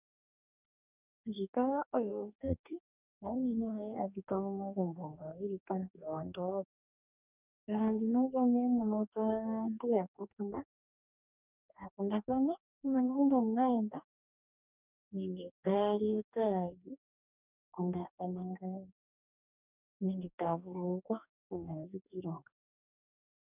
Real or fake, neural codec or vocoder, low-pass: fake; codec, 44.1 kHz, 2.6 kbps, DAC; 3.6 kHz